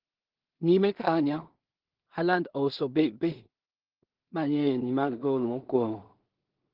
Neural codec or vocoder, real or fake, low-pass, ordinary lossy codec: codec, 16 kHz in and 24 kHz out, 0.4 kbps, LongCat-Audio-Codec, two codebook decoder; fake; 5.4 kHz; Opus, 16 kbps